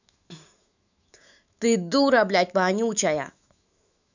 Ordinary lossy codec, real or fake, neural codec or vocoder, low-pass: none; fake; autoencoder, 48 kHz, 128 numbers a frame, DAC-VAE, trained on Japanese speech; 7.2 kHz